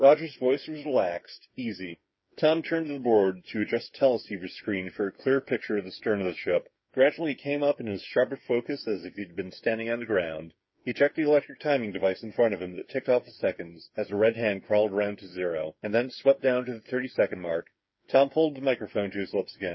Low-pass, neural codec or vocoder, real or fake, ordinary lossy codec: 7.2 kHz; codec, 16 kHz, 8 kbps, FreqCodec, smaller model; fake; MP3, 24 kbps